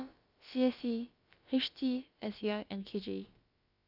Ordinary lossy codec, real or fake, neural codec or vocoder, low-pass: Opus, 64 kbps; fake; codec, 16 kHz, about 1 kbps, DyCAST, with the encoder's durations; 5.4 kHz